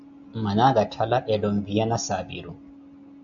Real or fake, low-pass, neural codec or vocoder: real; 7.2 kHz; none